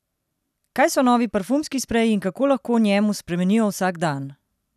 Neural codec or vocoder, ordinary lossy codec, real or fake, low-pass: none; none; real; 14.4 kHz